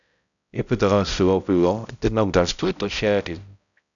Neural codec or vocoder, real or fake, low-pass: codec, 16 kHz, 0.5 kbps, X-Codec, HuBERT features, trained on balanced general audio; fake; 7.2 kHz